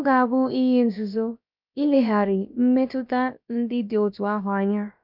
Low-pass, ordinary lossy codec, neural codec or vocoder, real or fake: 5.4 kHz; none; codec, 16 kHz, about 1 kbps, DyCAST, with the encoder's durations; fake